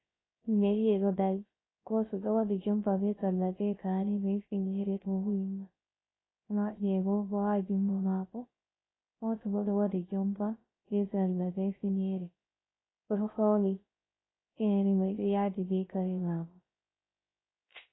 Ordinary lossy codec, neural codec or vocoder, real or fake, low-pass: AAC, 16 kbps; codec, 16 kHz, 0.3 kbps, FocalCodec; fake; 7.2 kHz